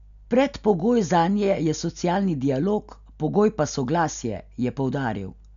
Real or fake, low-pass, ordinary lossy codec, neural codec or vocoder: real; 7.2 kHz; MP3, 96 kbps; none